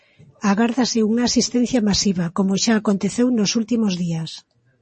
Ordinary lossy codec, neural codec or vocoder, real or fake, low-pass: MP3, 32 kbps; none; real; 10.8 kHz